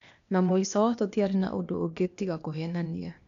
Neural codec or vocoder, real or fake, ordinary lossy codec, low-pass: codec, 16 kHz, 0.8 kbps, ZipCodec; fake; none; 7.2 kHz